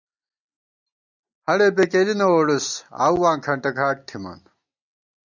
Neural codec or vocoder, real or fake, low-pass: none; real; 7.2 kHz